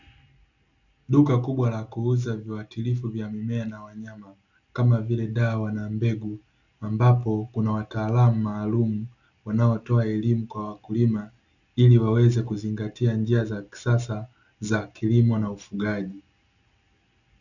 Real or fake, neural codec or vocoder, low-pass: real; none; 7.2 kHz